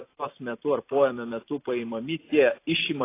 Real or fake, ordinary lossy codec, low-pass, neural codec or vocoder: fake; AAC, 24 kbps; 3.6 kHz; vocoder, 44.1 kHz, 128 mel bands every 256 samples, BigVGAN v2